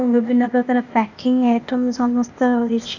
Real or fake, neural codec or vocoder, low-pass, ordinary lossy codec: fake; codec, 16 kHz, 0.8 kbps, ZipCodec; 7.2 kHz; none